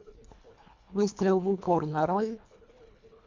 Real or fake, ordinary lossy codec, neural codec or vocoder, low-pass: fake; MP3, 64 kbps; codec, 24 kHz, 1.5 kbps, HILCodec; 7.2 kHz